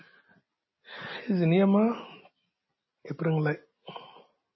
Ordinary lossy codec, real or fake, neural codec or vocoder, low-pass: MP3, 24 kbps; real; none; 7.2 kHz